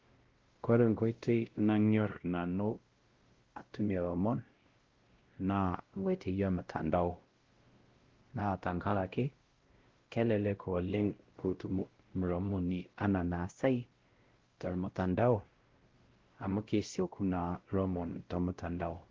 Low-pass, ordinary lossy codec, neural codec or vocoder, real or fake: 7.2 kHz; Opus, 16 kbps; codec, 16 kHz, 0.5 kbps, X-Codec, WavLM features, trained on Multilingual LibriSpeech; fake